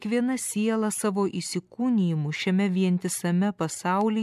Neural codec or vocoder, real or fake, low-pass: none; real; 14.4 kHz